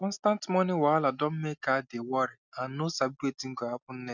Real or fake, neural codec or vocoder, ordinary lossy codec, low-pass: real; none; none; 7.2 kHz